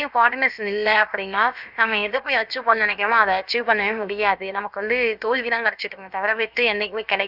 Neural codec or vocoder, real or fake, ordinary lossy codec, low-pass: codec, 16 kHz, about 1 kbps, DyCAST, with the encoder's durations; fake; none; 5.4 kHz